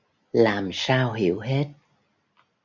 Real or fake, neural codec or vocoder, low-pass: real; none; 7.2 kHz